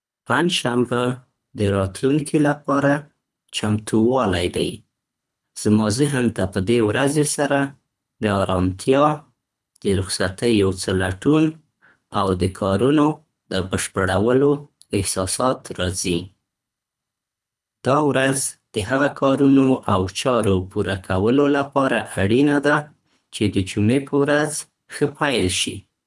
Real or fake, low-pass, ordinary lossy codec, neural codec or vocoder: fake; none; none; codec, 24 kHz, 3 kbps, HILCodec